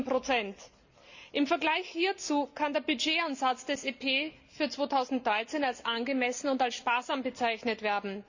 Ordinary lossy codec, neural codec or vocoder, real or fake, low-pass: Opus, 64 kbps; none; real; 7.2 kHz